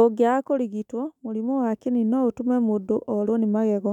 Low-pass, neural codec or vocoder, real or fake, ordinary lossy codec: 19.8 kHz; autoencoder, 48 kHz, 128 numbers a frame, DAC-VAE, trained on Japanese speech; fake; none